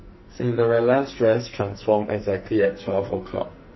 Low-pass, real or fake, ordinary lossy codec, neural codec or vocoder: 7.2 kHz; fake; MP3, 24 kbps; codec, 44.1 kHz, 2.6 kbps, SNAC